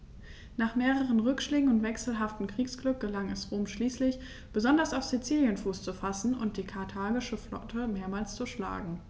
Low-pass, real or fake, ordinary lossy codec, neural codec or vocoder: none; real; none; none